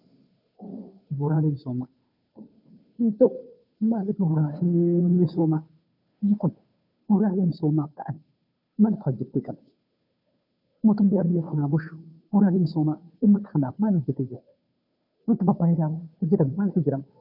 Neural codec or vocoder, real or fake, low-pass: codec, 16 kHz, 2 kbps, FunCodec, trained on Chinese and English, 25 frames a second; fake; 5.4 kHz